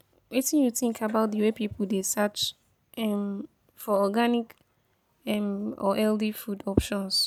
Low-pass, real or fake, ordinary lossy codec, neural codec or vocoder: none; real; none; none